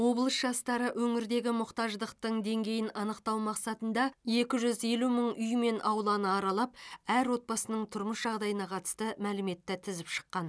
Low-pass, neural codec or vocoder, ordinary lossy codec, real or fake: none; none; none; real